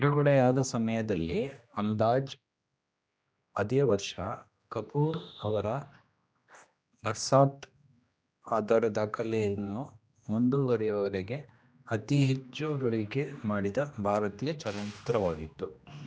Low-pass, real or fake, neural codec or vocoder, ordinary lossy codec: none; fake; codec, 16 kHz, 1 kbps, X-Codec, HuBERT features, trained on general audio; none